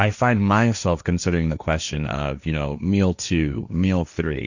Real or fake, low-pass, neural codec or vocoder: fake; 7.2 kHz; codec, 16 kHz, 1.1 kbps, Voila-Tokenizer